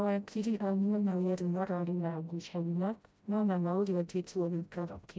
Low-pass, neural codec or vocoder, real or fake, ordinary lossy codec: none; codec, 16 kHz, 0.5 kbps, FreqCodec, smaller model; fake; none